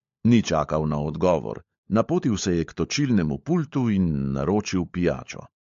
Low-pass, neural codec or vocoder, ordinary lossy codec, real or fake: 7.2 kHz; codec, 16 kHz, 16 kbps, FunCodec, trained on LibriTTS, 50 frames a second; MP3, 48 kbps; fake